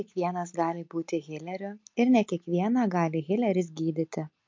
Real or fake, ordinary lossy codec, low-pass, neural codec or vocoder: real; MP3, 48 kbps; 7.2 kHz; none